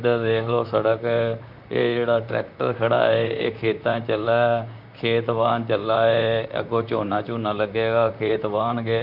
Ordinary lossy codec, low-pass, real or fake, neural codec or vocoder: none; 5.4 kHz; fake; vocoder, 44.1 kHz, 128 mel bands, Pupu-Vocoder